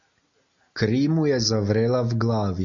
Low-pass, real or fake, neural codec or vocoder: 7.2 kHz; real; none